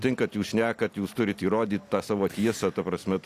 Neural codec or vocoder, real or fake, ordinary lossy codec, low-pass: none; real; AAC, 64 kbps; 14.4 kHz